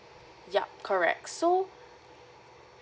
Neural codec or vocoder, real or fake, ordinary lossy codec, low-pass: none; real; none; none